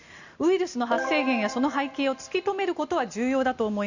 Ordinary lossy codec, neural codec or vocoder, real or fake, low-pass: none; none; real; 7.2 kHz